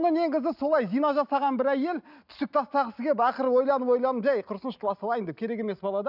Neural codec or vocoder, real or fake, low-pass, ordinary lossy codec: none; real; 5.4 kHz; none